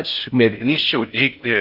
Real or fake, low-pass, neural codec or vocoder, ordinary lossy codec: fake; 5.4 kHz; codec, 16 kHz in and 24 kHz out, 0.6 kbps, FocalCodec, streaming, 4096 codes; AAC, 48 kbps